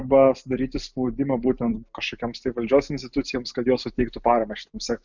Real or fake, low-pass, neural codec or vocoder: real; 7.2 kHz; none